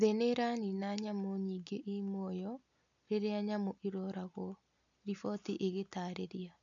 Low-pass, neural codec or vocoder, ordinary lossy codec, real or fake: 7.2 kHz; none; none; real